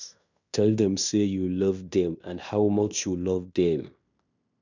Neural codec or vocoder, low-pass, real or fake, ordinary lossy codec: codec, 16 kHz in and 24 kHz out, 0.9 kbps, LongCat-Audio-Codec, fine tuned four codebook decoder; 7.2 kHz; fake; none